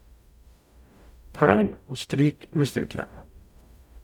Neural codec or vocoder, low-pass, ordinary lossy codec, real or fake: codec, 44.1 kHz, 0.9 kbps, DAC; 19.8 kHz; none; fake